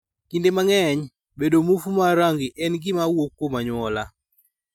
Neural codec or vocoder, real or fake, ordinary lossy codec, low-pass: none; real; none; 19.8 kHz